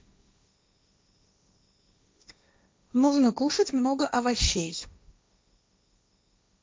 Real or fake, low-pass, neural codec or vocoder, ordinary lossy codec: fake; none; codec, 16 kHz, 1.1 kbps, Voila-Tokenizer; none